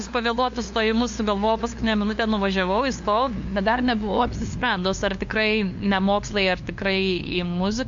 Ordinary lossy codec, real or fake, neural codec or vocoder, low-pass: MP3, 48 kbps; fake; codec, 16 kHz, 2 kbps, FunCodec, trained on LibriTTS, 25 frames a second; 7.2 kHz